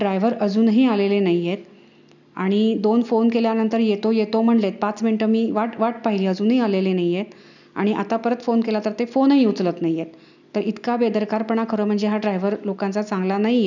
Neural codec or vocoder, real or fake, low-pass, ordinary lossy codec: none; real; 7.2 kHz; none